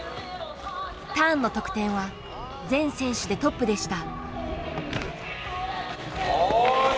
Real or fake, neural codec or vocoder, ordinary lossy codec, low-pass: real; none; none; none